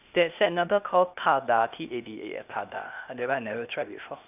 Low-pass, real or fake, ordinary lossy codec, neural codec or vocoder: 3.6 kHz; fake; none; codec, 16 kHz, 0.8 kbps, ZipCodec